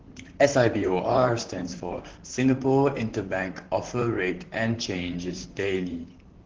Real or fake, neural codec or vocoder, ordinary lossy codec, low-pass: fake; vocoder, 44.1 kHz, 128 mel bands, Pupu-Vocoder; Opus, 16 kbps; 7.2 kHz